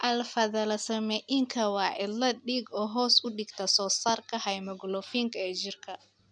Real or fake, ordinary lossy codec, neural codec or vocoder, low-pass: real; none; none; 9.9 kHz